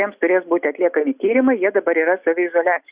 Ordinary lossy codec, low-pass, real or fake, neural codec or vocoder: Opus, 64 kbps; 3.6 kHz; real; none